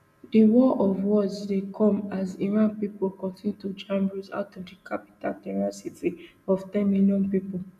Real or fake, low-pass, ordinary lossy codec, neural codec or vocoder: real; 14.4 kHz; none; none